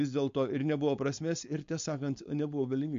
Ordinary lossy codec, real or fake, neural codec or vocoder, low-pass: MP3, 48 kbps; fake; codec, 16 kHz, 4.8 kbps, FACodec; 7.2 kHz